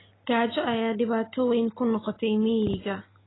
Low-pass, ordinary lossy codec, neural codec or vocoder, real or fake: 7.2 kHz; AAC, 16 kbps; none; real